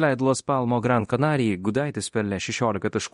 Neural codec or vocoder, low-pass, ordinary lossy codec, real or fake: codec, 24 kHz, 0.9 kbps, DualCodec; 10.8 kHz; MP3, 48 kbps; fake